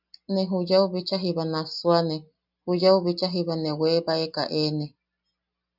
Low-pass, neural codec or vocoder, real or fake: 5.4 kHz; none; real